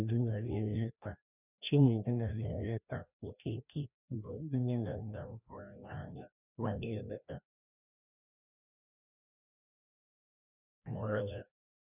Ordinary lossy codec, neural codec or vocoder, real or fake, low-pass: none; codec, 16 kHz, 1 kbps, FreqCodec, larger model; fake; 3.6 kHz